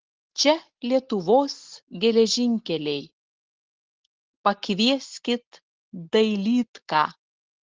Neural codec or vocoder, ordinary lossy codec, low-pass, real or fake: none; Opus, 16 kbps; 7.2 kHz; real